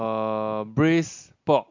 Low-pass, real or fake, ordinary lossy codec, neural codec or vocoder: 7.2 kHz; real; MP3, 64 kbps; none